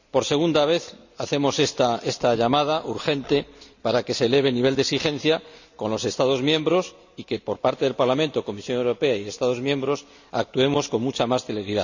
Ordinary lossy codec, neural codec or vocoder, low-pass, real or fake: none; none; 7.2 kHz; real